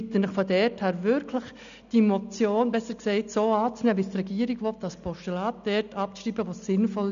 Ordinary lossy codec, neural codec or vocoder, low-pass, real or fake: none; none; 7.2 kHz; real